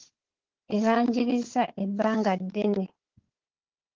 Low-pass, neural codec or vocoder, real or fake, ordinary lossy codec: 7.2 kHz; codec, 16 kHz, 4 kbps, FunCodec, trained on Chinese and English, 50 frames a second; fake; Opus, 16 kbps